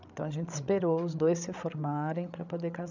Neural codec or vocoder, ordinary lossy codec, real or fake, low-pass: codec, 16 kHz, 16 kbps, FreqCodec, larger model; none; fake; 7.2 kHz